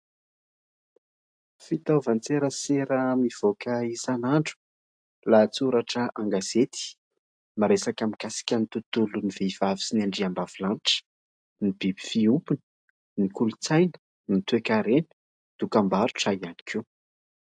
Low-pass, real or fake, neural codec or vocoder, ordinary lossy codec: 9.9 kHz; real; none; AAC, 64 kbps